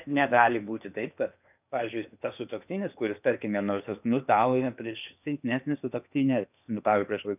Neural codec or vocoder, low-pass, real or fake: codec, 16 kHz in and 24 kHz out, 0.8 kbps, FocalCodec, streaming, 65536 codes; 3.6 kHz; fake